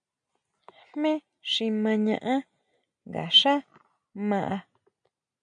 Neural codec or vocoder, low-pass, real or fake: none; 9.9 kHz; real